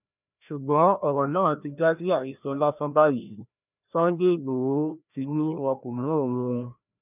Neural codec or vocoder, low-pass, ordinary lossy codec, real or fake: codec, 16 kHz, 1 kbps, FreqCodec, larger model; 3.6 kHz; none; fake